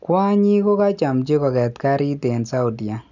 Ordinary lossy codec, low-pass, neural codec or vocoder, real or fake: none; 7.2 kHz; none; real